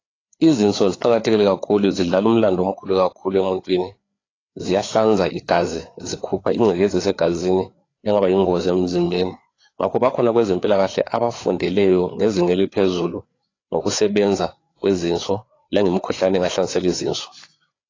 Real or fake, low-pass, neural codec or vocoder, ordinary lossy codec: fake; 7.2 kHz; codec, 16 kHz, 4 kbps, FreqCodec, larger model; AAC, 32 kbps